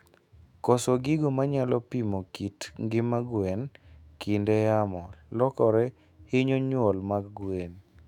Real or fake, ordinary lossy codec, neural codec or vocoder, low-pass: fake; none; autoencoder, 48 kHz, 128 numbers a frame, DAC-VAE, trained on Japanese speech; 19.8 kHz